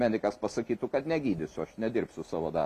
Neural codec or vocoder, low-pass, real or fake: none; 14.4 kHz; real